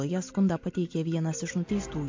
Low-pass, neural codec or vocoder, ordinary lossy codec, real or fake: 7.2 kHz; none; MP3, 48 kbps; real